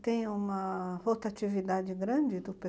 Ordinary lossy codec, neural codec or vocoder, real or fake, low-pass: none; none; real; none